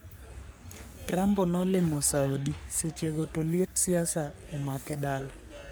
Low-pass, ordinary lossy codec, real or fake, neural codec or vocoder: none; none; fake; codec, 44.1 kHz, 3.4 kbps, Pupu-Codec